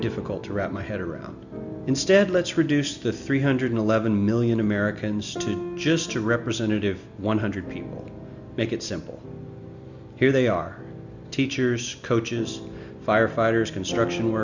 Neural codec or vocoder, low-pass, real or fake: none; 7.2 kHz; real